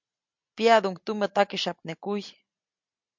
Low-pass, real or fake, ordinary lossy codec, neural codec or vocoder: 7.2 kHz; real; MP3, 64 kbps; none